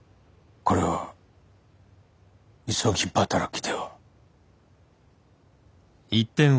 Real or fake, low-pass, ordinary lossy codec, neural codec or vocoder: real; none; none; none